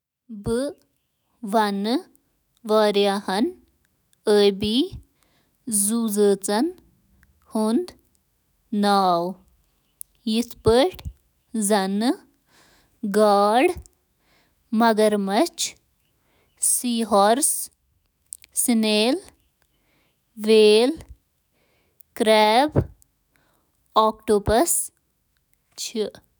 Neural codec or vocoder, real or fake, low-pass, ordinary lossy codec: autoencoder, 48 kHz, 128 numbers a frame, DAC-VAE, trained on Japanese speech; fake; none; none